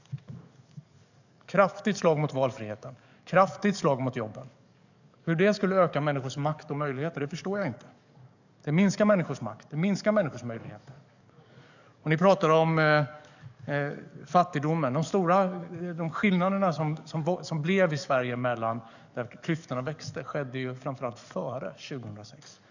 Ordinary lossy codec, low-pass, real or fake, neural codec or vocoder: none; 7.2 kHz; fake; codec, 44.1 kHz, 7.8 kbps, DAC